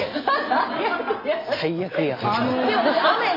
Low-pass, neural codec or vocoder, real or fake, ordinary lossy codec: 5.4 kHz; none; real; MP3, 32 kbps